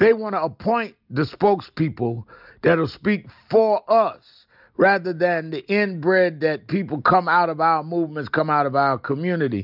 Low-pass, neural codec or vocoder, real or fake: 5.4 kHz; none; real